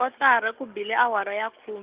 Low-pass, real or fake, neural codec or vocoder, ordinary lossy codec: 3.6 kHz; real; none; Opus, 32 kbps